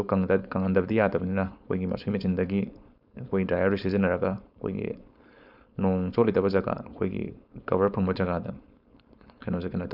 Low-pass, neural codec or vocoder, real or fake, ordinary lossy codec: 5.4 kHz; codec, 16 kHz, 4.8 kbps, FACodec; fake; none